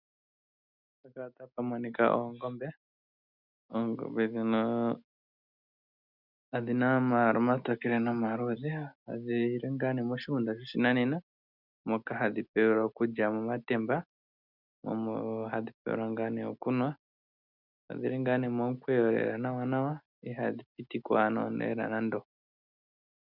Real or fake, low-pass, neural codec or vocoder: real; 5.4 kHz; none